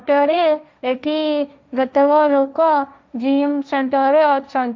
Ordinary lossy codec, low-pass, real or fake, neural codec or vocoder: none; none; fake; codec, 16 kHz, 1.1 kbps, Voila-Tokenizer